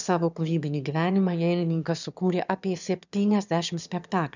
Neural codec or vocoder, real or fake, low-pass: autoencoder, 22.05 kHz, a latent of 192 numbers a frame, VITS, trained on one speaker; fake; 7.2 kHz